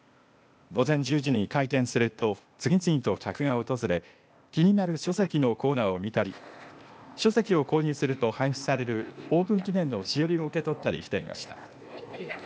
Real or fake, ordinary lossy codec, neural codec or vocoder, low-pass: fake; none; codec, 16 kHz, 0.8 kbps, ZipCodec; none